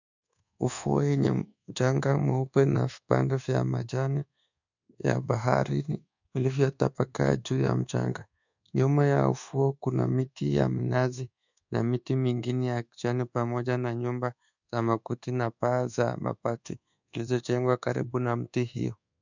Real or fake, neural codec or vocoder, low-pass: fake; codec, 24 kHz, 1.2 kbps, DualCodec; 7.2 kHz